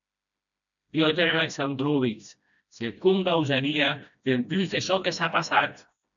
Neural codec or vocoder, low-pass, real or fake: codec, 16 kHz, 1 kbps, FreqCodec, smaller model; 7.2 kHz; fake